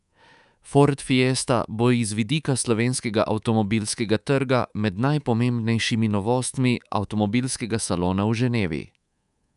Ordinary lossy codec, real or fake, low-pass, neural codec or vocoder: none; fake; 10.8 kHz; codec, 24 kHz, 3.1 kbps, DualCodec